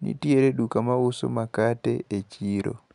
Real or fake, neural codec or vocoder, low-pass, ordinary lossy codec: real; none; 10.8 kHz; none